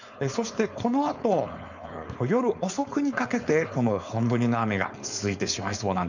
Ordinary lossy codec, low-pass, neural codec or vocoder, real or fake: none; 7.2 kHz; codec, 16 kHz, 4.8 kbps, FACodec; fake